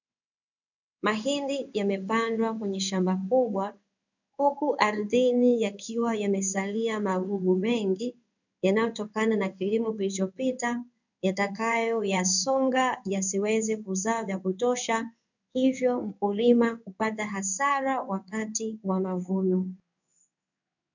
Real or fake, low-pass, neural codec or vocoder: fake; 7.2 kHz; codec, 16 kHz in and 24 kHz out, 1 kbps, XY-Tokenizer